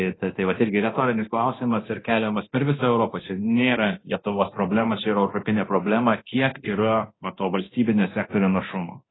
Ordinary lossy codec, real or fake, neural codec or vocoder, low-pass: AAC, 16 kbps; fake; codec, 24 kHz, 0.9 kbps, DualCodec; 7.2 kHz